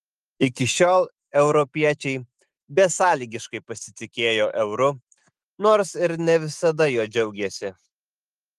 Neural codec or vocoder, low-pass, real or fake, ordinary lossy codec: autoencoder, 48 kHz, 128 numbers a frame, DAC-VAE, trained on Japanese speech; 14.4 kHz; fake; Opus, 24 kbps